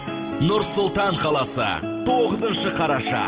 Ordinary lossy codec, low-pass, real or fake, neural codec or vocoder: Opus, 16 kbps; 3.6 kHz; real; none